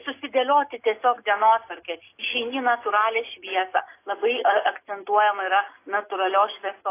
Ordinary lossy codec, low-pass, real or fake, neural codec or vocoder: AAC, 24 kbps; 3.6 kHz; real; none